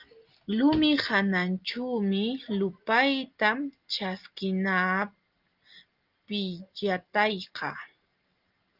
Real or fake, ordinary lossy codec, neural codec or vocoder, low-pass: real; Opus, 24 kbps; none; 5.4 kHz